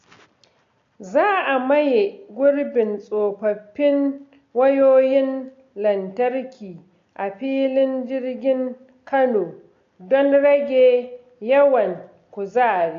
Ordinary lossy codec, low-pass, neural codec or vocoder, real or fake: AAC, 48 kbps; 7.2 kHz; none; real